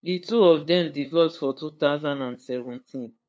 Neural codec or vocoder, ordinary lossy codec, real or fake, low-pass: codec, 16 kHz, 2 kbps, FunCodec, trained on LibriTTS, 25 frames a second; none; fake; none